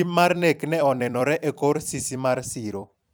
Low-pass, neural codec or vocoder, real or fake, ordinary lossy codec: none; vocoder, 44.1 kHz, 128 mel bands every 256 samples, BigVGAN v2; fake; none